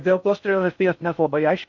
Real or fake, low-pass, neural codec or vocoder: fake; 7.2 kHz; codec, 16 kHz in and 24 kHz out, 0.6 kbps, FocalCodec, streaming, 4096 codes